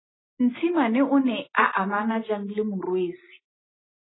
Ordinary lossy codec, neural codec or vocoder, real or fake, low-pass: AAC, 16 kbps; none; real; 7.2 kHz